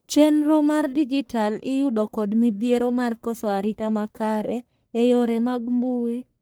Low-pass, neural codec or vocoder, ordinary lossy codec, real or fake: none; codec, 44.1 kHz, 1.7 kbps, Pupu-Codec; none; fake